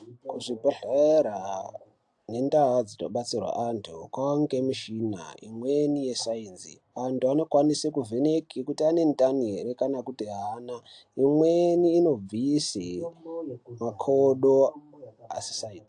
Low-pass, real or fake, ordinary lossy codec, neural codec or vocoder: 10.8 kHz; real; AAC, 64 kbps; none